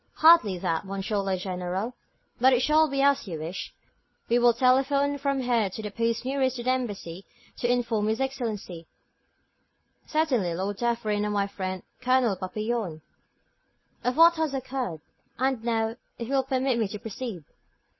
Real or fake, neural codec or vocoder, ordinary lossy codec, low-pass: real; none; MP3, 24 kbps; 7.2 kHz